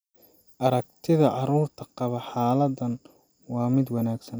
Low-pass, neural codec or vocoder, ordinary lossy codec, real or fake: none; none; none; real